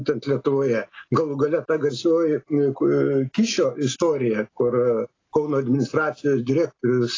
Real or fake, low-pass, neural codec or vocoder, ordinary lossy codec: real; 7.2 kHz; none; AAC, 32 kbps